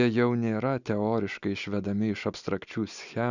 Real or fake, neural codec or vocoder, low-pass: real; none; 7.2 kHz